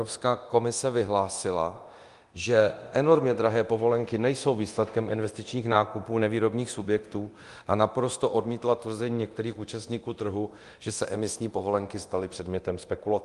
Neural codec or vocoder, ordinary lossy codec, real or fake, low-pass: codec, 24 kHz, 0.9 kbps, DualCodec; Opus, 24 kbps; fake; 10.8 kHz